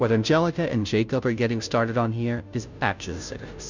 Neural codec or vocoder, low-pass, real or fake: codec, 16 kHz, 0.5 kbps, FunCodec, trained on Chinese and English, 25 frames a second; 7.2 kHz; fake